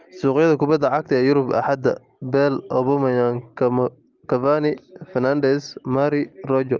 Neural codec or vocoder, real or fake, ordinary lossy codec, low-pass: none; real; Opus, 32 kbps; 7.2 kHz